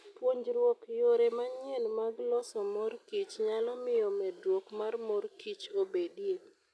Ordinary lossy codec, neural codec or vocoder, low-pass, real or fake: none; none; none; real